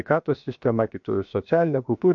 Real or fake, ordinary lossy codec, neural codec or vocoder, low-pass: fake; MP3, 48 kbps; codec, 16 kHz, 0.7 kbps, FocalCodec; 7.2 kHz